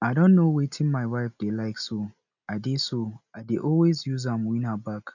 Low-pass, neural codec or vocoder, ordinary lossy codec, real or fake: 7.2 kHz; none; none; real